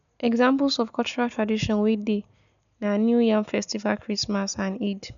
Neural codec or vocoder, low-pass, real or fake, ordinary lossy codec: none; 7.2 kHz; real; none